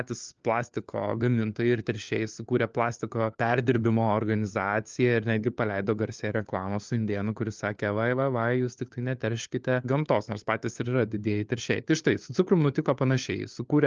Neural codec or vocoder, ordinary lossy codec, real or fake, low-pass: codec, 16 kHz, 4 kbps, FunCodec, trained on LibriTTS, 50 frames a second; Opus, 24 kbps; fake; 7.2 kHz